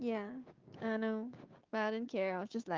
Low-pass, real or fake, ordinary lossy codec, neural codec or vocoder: 7.2 kHz; fake; Opus, 24 kbps; codec, 16 kHz, 6 kbps, DAC